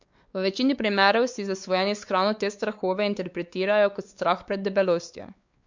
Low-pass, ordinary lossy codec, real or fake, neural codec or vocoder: 7.2 kHz; Opus, 64 kbps; fake; codec, 16 kHz, 4 kbps, X-Codec, WavLM features, trained on Multilingual LibriSpeech